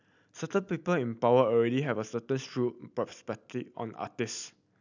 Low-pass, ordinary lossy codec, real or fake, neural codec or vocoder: 7.2 kHz; none; real; none